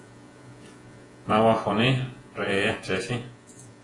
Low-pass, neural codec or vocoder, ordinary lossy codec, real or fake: 10.8 kHz; vocoder, 48 kHz, 128 mel bands, Vocos; AAC, 32 kbps; fake